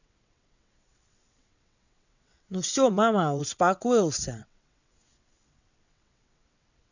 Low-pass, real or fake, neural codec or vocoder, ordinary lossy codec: 7.2 kHz; fake; vocoder, 22.05 kHz, 80 mel bands, WaveNeXt; none